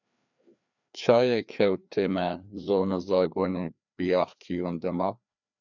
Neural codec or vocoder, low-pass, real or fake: codec, 16 kHz, 2 kbps, FreqCodec, larger model; 7.2 kHz; fake